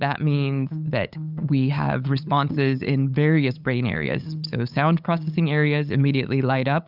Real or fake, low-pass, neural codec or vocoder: fake; 5.4 kHz; codec, 16 kHz, 8 kbps, FunCodec, trained on LibriTTS, 25 frames a second